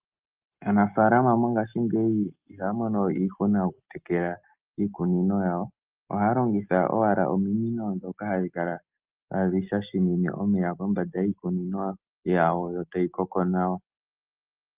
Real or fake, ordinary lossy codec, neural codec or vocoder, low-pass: real; Opus, 32 kbps; none; 3.6 kHz